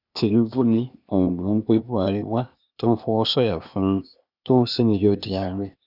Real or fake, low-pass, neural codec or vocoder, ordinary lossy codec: fake; 5.4 kHz; codec, 16 kHz, 0.8 kbps, ZipCodec; none